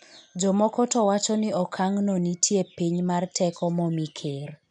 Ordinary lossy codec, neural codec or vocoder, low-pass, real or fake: none; none; 9.9 kHz; real